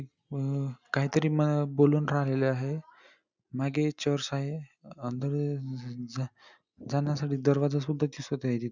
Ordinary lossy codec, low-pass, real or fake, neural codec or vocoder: none; 7.2 kHz; real; none